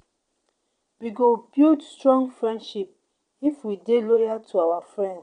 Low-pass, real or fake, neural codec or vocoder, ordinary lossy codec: 9.9 kHz; fake; vocoder, 22.05 kHz, 80 mel bands, Vocos; none